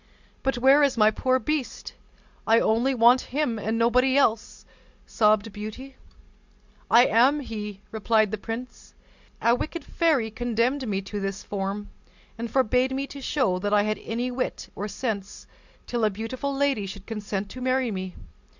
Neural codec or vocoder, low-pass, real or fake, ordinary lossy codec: none; 7.2 kHz; real; Opus, 64 kbps